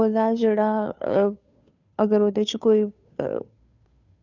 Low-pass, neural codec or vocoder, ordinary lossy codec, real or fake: 7.2 kHz; codec, 16 kHz, 2 kbps, FunCodec, trained on Chinese and English, 25 frames a second; none; fake